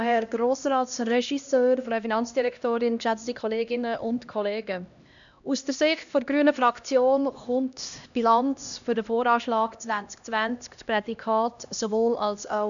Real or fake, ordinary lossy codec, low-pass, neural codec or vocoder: fake; none; 7.2 kHz; codec, 16 kHz, 1 kbps, X-Codec, HuBERT features, trained on LibriSpeech